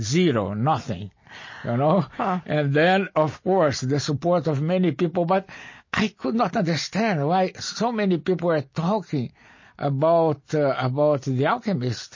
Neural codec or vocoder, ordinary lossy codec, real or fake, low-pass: none; MP3, 32 kbps; real; 7.2 kHz